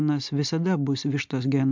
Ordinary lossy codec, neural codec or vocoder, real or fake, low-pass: MP3, 64 kbps; none; real; 7.2 kHz